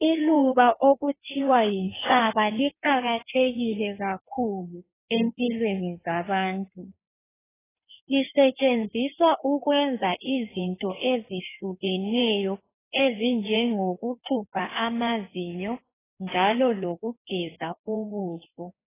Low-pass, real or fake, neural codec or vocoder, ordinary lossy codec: 3.6 kHz; fake; codec, 16 kHz in and 24 kHz out, 1.1 kbps, FireRedTTS-2 codec; AAC, 16 kbps